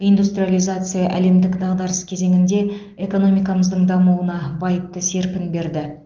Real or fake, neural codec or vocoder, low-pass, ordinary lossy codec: real; none; 7.2 kHz; Opus, 24 kbps